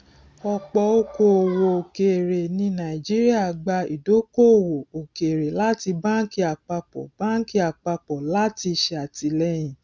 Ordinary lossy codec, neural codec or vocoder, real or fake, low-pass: none; none; real; none